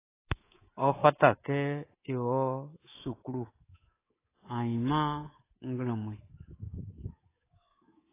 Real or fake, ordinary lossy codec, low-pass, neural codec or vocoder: fake; AAC, 16 kbps; 3.6 kHz; codec, 24 kHz, 3.1 kbps, DualCodec